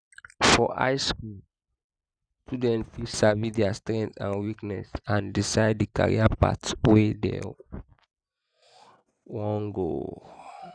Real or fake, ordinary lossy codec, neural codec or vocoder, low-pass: real; none; none; 9.9 kHz